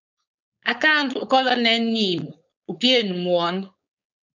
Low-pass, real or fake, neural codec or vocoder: 7.2 kHz; fake; codec, 16 kHz, 4.8 kbps, FACodec